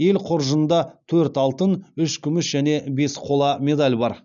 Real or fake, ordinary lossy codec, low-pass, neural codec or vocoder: real; none; 7.2 kHz; none